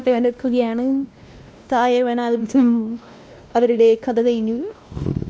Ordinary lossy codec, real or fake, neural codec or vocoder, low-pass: none; fake; codec, 16 kHz, 1 kbps, X-Codec, WavLM features, trained on Multilingual LibriSpeech; none